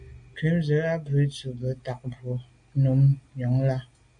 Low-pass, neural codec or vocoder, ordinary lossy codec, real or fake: 9.9 kHz; none; AAC, 64 kbps; real